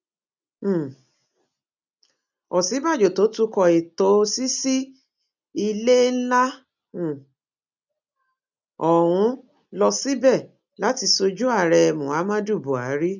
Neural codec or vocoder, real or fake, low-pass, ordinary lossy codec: none; real; 7.2 kHz; none